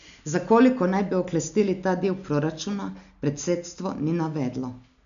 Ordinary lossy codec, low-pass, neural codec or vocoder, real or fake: none; 7.2 kHz; none; real